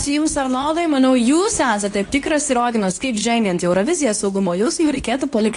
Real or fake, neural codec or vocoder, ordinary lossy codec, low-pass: fake; codec, 24 kHz, 0.9 kbps, WavTokenizer, medium speech release version 2; AAC, 48 kbps; 10.8 kHz